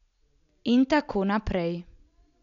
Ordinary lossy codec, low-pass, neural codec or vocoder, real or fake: none; 7.2 kHz; none; real